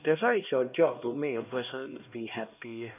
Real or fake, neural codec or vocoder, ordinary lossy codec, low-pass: fake; codec, 16 kHz, 1 kbps, X-Codec, HuBERT features, trained on LibriSpeech; none; 3.6 kHz